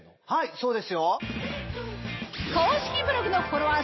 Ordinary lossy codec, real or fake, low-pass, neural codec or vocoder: MP3, 24 kbps; real; 7.2 kHz; none